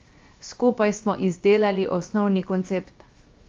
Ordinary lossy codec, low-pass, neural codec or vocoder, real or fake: Opus, 32 kbps; 7.2 kHz; codec, 16 kHz, 0.7 kbps, FocalCodec; fake